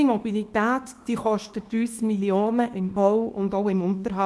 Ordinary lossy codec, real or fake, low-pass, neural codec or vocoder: none; fake; none; codec, 24 kHz, 0.9 kbps, WavTokenizer, small release